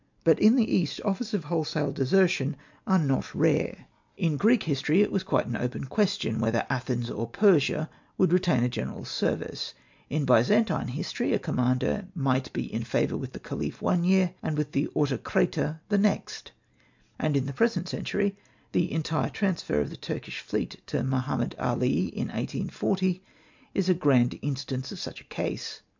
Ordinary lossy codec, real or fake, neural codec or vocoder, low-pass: MP3, 64 kbps; real; none; 7.2 kHz